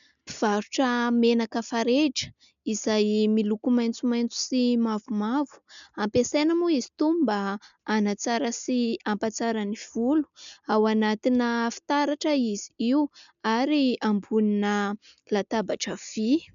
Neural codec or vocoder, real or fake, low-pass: none; real; 7.2 kHz